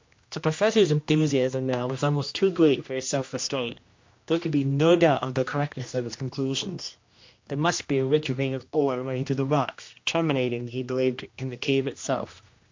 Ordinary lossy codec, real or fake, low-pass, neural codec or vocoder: MP3, 48 kbps; fake; 7.2 kHz; codec, 16 kHz, 1 kbps, X-Codec, HuBERT features, trained on general audio